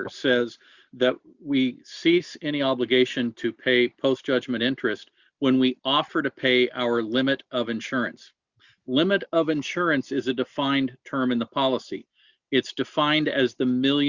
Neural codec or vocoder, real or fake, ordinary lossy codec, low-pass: none; real; Opus, 64 kbps; 7.2 kHz